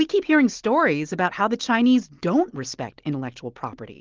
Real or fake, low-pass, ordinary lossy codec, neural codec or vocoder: real; 7.2 kHz; Opus, 16 kbps; none